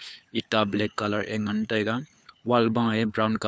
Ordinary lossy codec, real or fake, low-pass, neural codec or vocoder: none; fake; none; codec, 16 kHz, 4 kbps, FunCodec, trained on LibriTTS, 50 frames a second